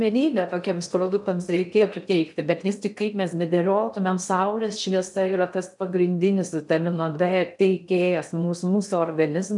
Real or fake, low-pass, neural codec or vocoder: fake; 10.8 kHz; codec, 16 kHz in and 24 kHz out, 0.6 kbps, FocalCodec, streaming, 2048 codes